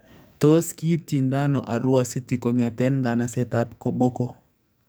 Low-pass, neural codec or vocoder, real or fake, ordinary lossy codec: none; codec, 44.1 kHz, 2.6 kbps, SNAC; fake; none